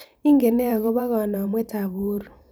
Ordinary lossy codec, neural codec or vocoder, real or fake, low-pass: none; vocoder, 44.1 kHz, 128 mel bands every 512 samples, BigVGAN v2; fake; none